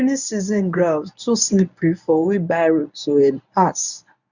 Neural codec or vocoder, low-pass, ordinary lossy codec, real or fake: codec, 24 kHz, 0.9 kbps, WavTokenizer, medium speech release version 1; 7.2 kHz; none; fake